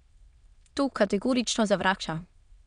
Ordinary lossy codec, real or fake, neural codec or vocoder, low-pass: none; fake; autoencoder, 22.05 kHz, a latent of 192 numbers a frame, VITS, trained on many speakers; 9.9 kHz